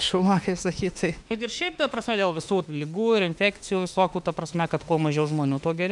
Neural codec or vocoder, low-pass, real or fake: autoencoder, 48 kHz, 32 numbers a frame, DAC-VAE, trained on Japanese speech; 10.8 kHz; fake